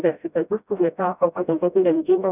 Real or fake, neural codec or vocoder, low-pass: fake; codec, 16 kHz, 0.5 kbps, FreqCodec, smaller model; 3.6 kHz